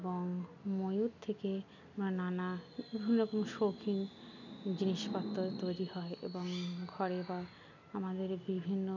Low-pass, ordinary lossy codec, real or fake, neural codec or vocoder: 7.2 kHz; none; real; none